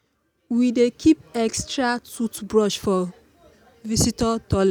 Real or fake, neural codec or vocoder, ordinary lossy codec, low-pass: real; none; none; none